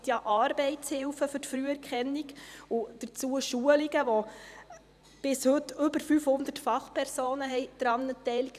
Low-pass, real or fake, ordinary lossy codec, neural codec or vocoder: 14.4 kHz; fake; none; vocoder, 44.1 kHz, 128 mel bands every 512 samples, BigVGAN v2